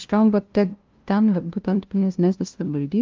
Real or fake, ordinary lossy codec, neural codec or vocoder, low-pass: fake; Opus, 32 kbps; codec, 16 kHz, 0.5 kbps, FunCodec, trained on LibriTTS, 25 frames a second; 7.2 kHz